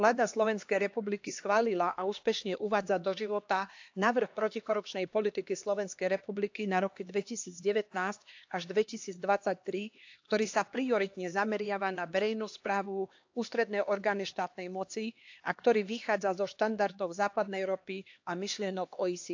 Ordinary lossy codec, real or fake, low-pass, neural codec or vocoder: AAC, 48 kbps; fake; 7.2 kHz; codec, 16 kHz, 2 kbps, X-Codec, HuBERT features, trained on LibriSpeech